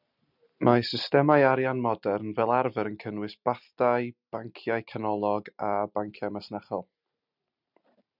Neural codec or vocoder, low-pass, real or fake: none; 5.4 kHz; real